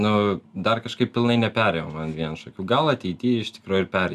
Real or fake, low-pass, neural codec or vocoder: real; 14.4 kHz; none